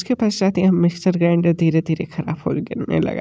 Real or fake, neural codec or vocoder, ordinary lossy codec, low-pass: real; none; none; none